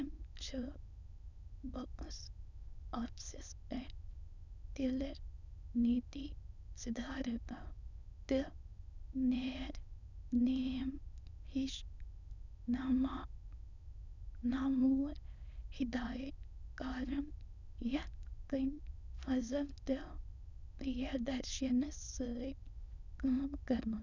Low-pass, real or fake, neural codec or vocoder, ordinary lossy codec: 7.2 kHz; fake; autoencoder, 22.05 kHz, a latent of 192 numbers a frame, VITS, trained on many speakers; none